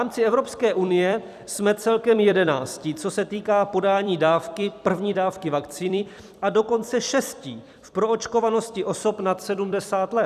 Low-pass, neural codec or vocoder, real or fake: 14.4 kHz; vocoder, 44.1 kHz, 128 mel bands every 256 samples, BigVGAN v2; fake